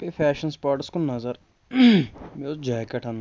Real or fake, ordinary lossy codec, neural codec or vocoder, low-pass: real; none; none; none